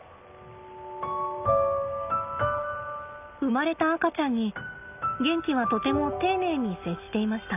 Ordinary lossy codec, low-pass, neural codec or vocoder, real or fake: none; 3.6 kHz; none; real